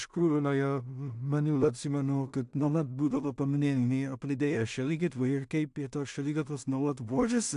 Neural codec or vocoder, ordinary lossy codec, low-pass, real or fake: codec, 16 kHz in and 24 kHz out, 0.4 kbps, LongCat-Audio-Codec, two codebook decoder; AAC, 96 kbps; 10.8 kHz; fake